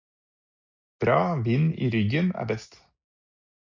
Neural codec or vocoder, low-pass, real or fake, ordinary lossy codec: none; 7.2 kHz; real; MP3, 64 kbps